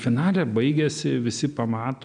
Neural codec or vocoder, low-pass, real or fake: none; 9.9 kHz; real